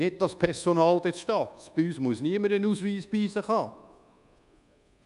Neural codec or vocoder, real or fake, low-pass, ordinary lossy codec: codec, 24 kHz, 1.2 kbps, DualCodec; fake; 10.8 kHz; none